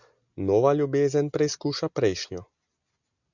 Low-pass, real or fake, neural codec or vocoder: 7.2 kHz; real; none